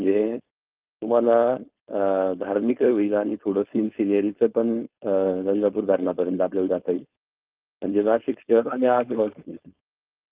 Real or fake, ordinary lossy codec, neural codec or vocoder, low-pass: fake; Opus, 32 kbps; codec, 16 kHz, 4.8 kbps, FACodec; 3.6 kHz